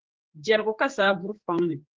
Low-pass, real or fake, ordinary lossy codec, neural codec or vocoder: 7.2 kHz; fake; Opus, 16 kbps; codec, 16 kHz, 4 kbps, X-Codec, HuBERT features, trained on general audio